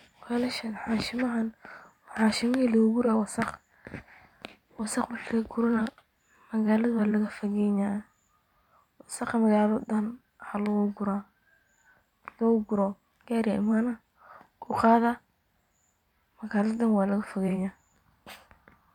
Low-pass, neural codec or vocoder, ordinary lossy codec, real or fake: 19.8 kHz; vocoder, 44.1 kHz, 128 mel bands every 512 samples, BigVGAN v2; none; fake